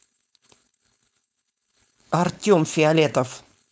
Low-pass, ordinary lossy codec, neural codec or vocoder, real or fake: none; none; codec, 16 kHz, 4.8 kbps, FACodec; fake